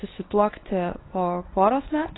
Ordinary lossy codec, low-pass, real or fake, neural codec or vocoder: AAC, 16 kbps; 7.2 kHz; fake; codec, 24 kHz, 0.9 kbps, WavTokenizer, medium speech release version 1